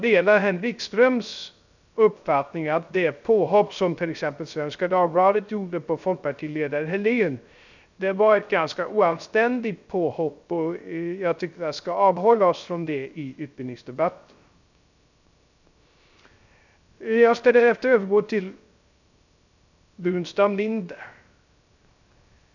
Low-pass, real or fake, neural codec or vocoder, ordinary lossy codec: 7.2 kHz; fake; codec, 16 kHz, 0.3 kbps, FocalCodec; none